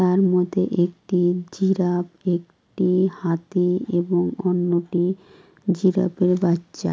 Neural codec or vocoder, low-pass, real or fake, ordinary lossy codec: none; none; real; none